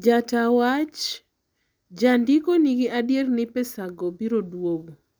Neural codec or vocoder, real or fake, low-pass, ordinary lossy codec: none; real; none; none